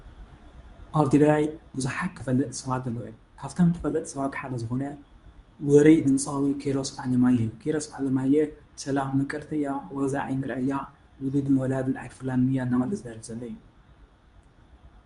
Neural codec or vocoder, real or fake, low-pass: codec, 24 kHz, 0.9 kbps, WavTokenizer, medium speech release version 2; fake; 10.8 kHz